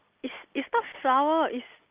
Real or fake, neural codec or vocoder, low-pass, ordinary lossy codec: real; none; 3.6 kHz; Opus, 24 kbps